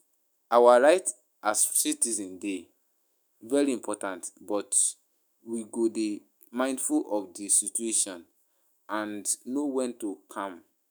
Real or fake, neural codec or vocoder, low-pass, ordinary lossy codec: fake; autoencoder, 48 kHz, 128 numbers a frame, DAC-VAE, trained on Japanese speech; none; none